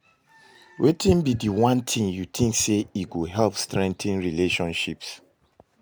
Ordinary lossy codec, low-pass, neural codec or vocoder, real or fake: none; none; none; real